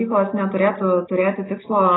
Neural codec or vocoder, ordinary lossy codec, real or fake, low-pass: none; AAC, 16 kbps; real; 7.2 kHz